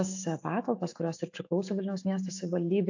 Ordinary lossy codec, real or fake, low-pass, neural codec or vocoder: AAC, 48 kbps; fake; 7.2 kHz; autoencoder, 48 kHz, 128 numbers a frame, DAC-VAE, trained on Japanese speech